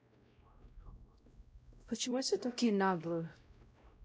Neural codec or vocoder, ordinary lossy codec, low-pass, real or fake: codec, 16 kHz, 0.5 kbps, X-Codec, WavLM features, trained on Multilingual LibriSpeech; none; none; fake